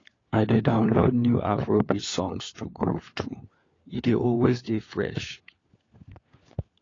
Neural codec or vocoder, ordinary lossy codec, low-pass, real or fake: codec, 16 kHz, 4 kbps, FunCodec, trained on LibriTTS, 50 frames a second; AAC, 32 kbps; 7.2 kHz; fake